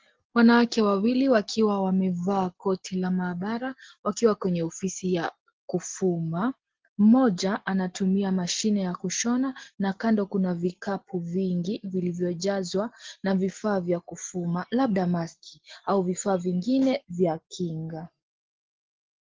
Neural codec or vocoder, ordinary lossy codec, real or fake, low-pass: none; Opus, 16 kbps; real; 7.2 kHz